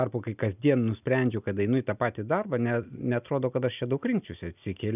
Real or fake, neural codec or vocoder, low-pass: real; none; 3.6 kHz